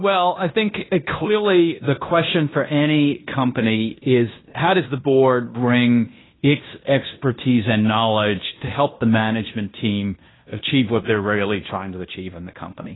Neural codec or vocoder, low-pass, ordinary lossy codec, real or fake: codec, 16 kHz in and 24 kHz out, 0.9 kbps, LongCat-Audio-Codec, fine tuned four codebook decoder; 7.2 kHz; AAC, 16 kbps; fake